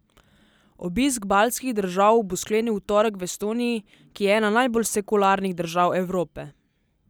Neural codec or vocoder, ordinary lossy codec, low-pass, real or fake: none; none; none; real